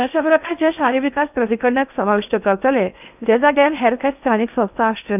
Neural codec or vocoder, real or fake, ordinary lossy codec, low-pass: codec, 16 kHz in and 24 kHz out, 0.8 kbps, FocalCodec, streaming, 65536 codes; fake; none; 3.6 kHz